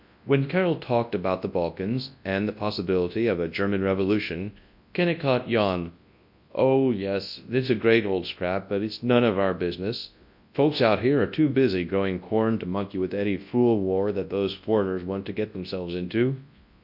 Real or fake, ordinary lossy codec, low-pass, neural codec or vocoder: fake; MP3, 48 kbps; 5.4 kHz; codec, 24 kHz, 0.9 kbps, WavTokenizer, large speech release